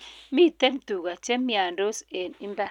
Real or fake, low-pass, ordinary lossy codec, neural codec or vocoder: real; 19.8 kHz; none; none